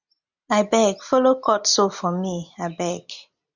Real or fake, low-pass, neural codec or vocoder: real; 7.2 kHz; none